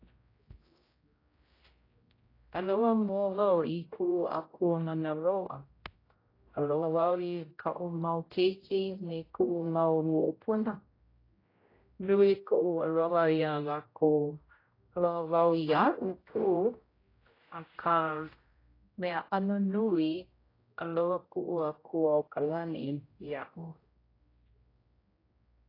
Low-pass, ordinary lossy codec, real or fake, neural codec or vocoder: 5.4 kHz; AAC, 24 kbps; fake; codec, 16 kHz, 0.5 kbps, X-Codec, HuBERT features, trained on general audio